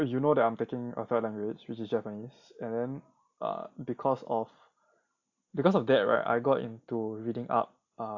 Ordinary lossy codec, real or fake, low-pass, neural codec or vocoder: AAC, 48 kbps; real; 7.2 kHz; none